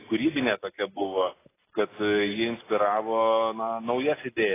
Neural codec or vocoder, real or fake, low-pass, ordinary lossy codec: none; real; 3.6 kHz; AAC, 16 kbps